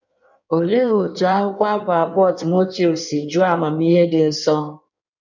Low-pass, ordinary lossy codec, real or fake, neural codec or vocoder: 7.2 kHz; none; fake; codec, 16 kHz in and 24 kHz out, 1.1 kbps, FireRedTTS-2 codec